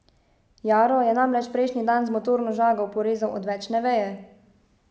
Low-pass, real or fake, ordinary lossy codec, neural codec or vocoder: none; real; none; none